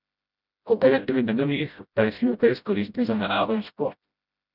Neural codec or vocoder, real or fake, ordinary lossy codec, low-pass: codec, 16 kHz, 0.5 kbps, FreqCodec, smaller model; fake; none; 5.4 kHz